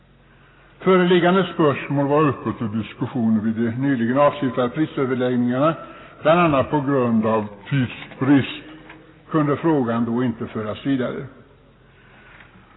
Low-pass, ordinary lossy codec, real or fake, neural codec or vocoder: 7.2 kHz; AAC, 16 kbps; real; none